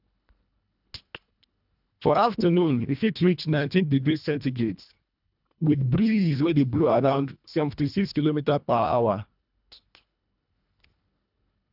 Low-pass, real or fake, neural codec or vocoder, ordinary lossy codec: 5.4 kHz; fake; codec, 24 kHz, 1.5 kbps, HILCodec; none